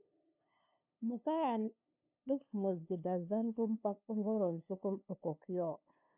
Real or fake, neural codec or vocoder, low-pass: fake; codec, 16 kHz, 2 kbps, FunCodec, trained on LibriTTS, 25 frames a second; 3.6 kHz